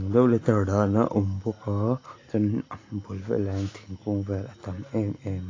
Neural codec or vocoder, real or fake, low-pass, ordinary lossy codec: none; real; 7.2 kHz; AAC, 32 kbps